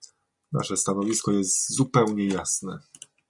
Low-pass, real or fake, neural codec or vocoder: 10.8 kHz; real; none